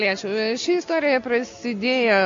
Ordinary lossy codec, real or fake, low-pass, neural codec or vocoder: MP3, 48 kbps; real; 7.2 kHz; none